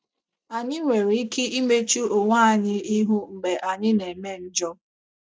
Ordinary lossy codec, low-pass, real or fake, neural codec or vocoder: none; none; real; none